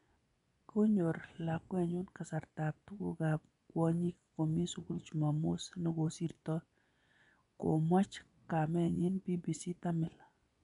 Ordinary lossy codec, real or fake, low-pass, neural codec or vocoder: none; fake; 10.8 kHz; vocoder, 24 kHz, 100 mel bands, Vocos